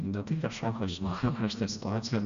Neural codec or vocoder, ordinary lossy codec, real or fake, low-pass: codec, 16 kHz, 1 kbps, FreqCodec, smaller model; Opus, 64 kbps; fake; 7.2 kHz